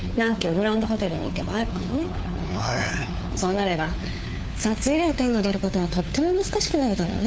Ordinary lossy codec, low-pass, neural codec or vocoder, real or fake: none; none; codec, 16 kHz, 4 kbps, FunCodec, trained on LibriTTS, 50 frames a second; fake